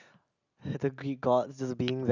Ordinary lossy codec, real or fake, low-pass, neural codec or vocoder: none; real; 7.2 kHz; none